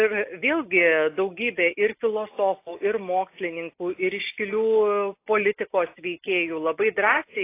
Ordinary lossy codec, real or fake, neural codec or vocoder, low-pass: AAC, 24 kbps; real; none; 3.6 kHz